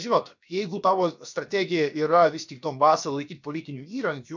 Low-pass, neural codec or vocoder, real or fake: 7.2 kHz; codec, 16 kHz, about 1 kbps, DyCAST, with the encoder's durations; fake